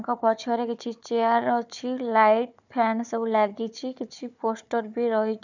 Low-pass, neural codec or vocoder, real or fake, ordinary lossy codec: 7.2 kHz; codec, 16 kHz, 4 kbps, FunCodec, trained on Chinese and English, 50 frames a second; fake; none